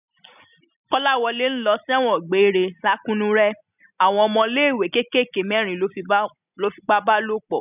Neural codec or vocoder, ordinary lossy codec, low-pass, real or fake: none; none; 3.6 kHz; real